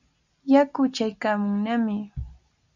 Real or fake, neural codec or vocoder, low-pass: real; none; 7.2 kHz